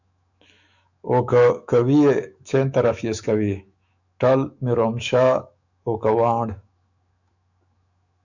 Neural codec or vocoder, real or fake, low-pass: autoencoder, 48 kHz, 128 numbers a frame, DAC-VAE, trained on Japanese speech; fake; 7.2 kHz